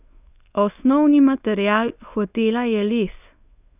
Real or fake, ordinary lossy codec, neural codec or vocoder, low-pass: fake; none; codec, 24 kHz, 0.9 kbps, WavTokenizer, medium speech release version 1; 3.6 kHz